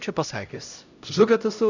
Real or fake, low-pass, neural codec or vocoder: fake; 7.2 kHz; codec, 16 kHz, 0.5 kbps, X-Codec, HuBERT features, trained on LibriSpeech